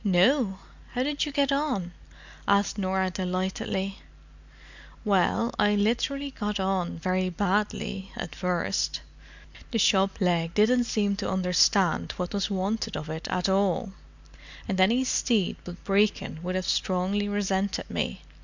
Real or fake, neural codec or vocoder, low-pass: real; none; 7.2 kHz